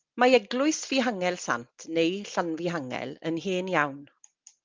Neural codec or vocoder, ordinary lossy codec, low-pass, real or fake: none; Opus, 24 kbps; 7.2 kHz; real